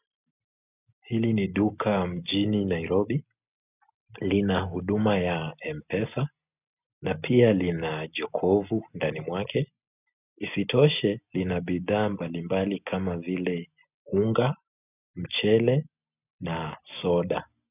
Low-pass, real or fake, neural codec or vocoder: 3.6 kHz; real; none